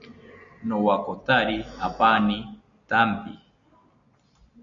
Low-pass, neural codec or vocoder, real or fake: 7.2 kHz; none; real